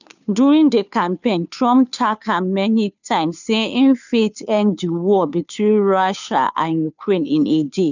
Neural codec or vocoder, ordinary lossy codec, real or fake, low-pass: codec, 16 kHz, 2 kbps, FunCodec, trained on Chinese and English, 25 frames a second; none; fake; 7.2 kHz